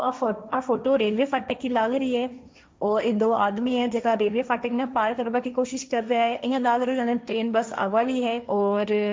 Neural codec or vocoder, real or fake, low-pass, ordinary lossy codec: codec, 16 kHz, 1.1 kbps, Voila-Tokenizer; fake; none; none